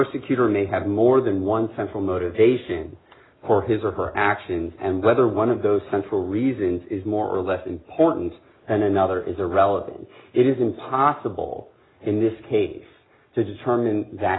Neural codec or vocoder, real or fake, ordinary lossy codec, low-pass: none; real; AAC, 16 kbps; 7.2 kHz